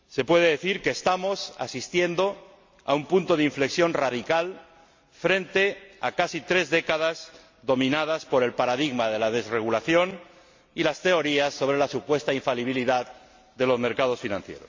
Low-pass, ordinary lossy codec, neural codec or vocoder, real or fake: 7.2 kHz; MP3, 48 kbps; none; real